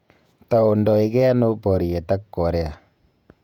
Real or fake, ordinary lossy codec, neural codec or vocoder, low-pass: fake; none; vocoder, 48 kHz, 128 mel bands, Vocos; 19.8 kHz